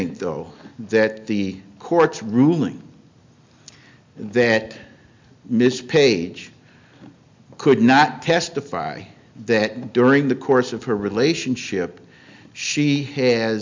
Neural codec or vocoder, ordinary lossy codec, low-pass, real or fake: none; MP3, 64 kbps; 7.2 kHz; real